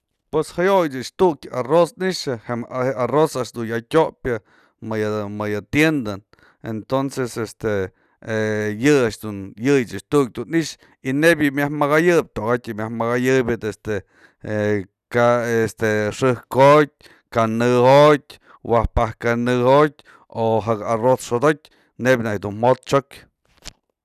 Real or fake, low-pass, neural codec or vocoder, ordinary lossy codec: real; 14.4 kHz; none; none